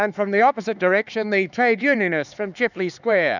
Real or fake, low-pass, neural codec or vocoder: fake; 7.2 kHz; codec, 16 kHz, 4 kbps, X-Codec, HuBERT features, trained on LibriSpeech